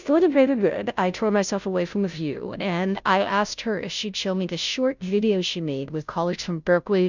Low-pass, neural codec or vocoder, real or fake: 7.2 kHz; codec, 16 kHz, 0.5 kbps, FunCodec, trained on Chinese and English, 25 frames a second; fake